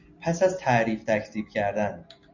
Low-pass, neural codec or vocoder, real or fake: 7.2 kHz; none; real